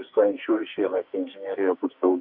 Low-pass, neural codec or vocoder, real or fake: 5.4 kHz; codec, 44.1 kHz, 2.6 kbps, SNAC; fake